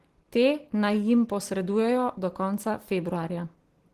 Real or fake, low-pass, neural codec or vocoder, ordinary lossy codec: real; 14.4 kHz; none; Opus, 16 kbps